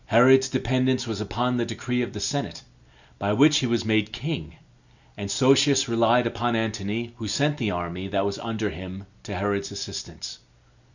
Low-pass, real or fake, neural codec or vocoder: 7.2 kHz; real; none